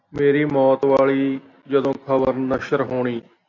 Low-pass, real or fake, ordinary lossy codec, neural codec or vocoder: 7.2 kHz; real; AAC, 48 kbps; none